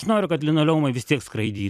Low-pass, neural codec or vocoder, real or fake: 14.4 kHz; vocoder, 44.1 kHz, 128 mel bands every 256 samples, BigVGAN v2; fake